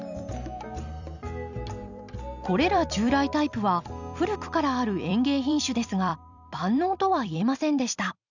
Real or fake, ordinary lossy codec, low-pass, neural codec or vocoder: fake; none; 7.2 kHz; vocoder, 44.1 kHz, 128 mel bands every 256 samples, BigVGAN v2